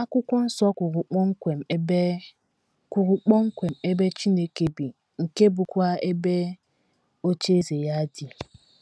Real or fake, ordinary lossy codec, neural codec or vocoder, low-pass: real; none; none; none